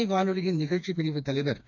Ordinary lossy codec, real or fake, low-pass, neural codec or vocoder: none; fake; 7.2 kHz; codec, 16 kHz, 2 kbps, FreqCodec, smaller model